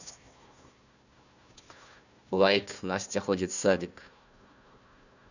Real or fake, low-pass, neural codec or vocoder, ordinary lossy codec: fake; 7.2 kHz; codec, 16 kHz, 1 kbps, FunCodec, trained on Chinese and English, 50 frames a second; none